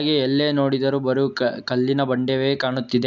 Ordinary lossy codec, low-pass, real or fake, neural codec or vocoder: none; 7.2 kHz; real; none